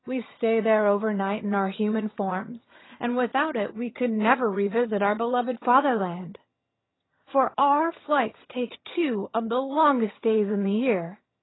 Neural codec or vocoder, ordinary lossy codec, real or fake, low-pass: vocoder, 22.05 kHz, 80 mel bands, HiFi-GAN; AAC, 16 kbps; fake; 7.2 kHz